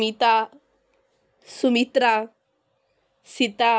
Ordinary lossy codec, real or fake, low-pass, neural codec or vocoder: none; real; none; none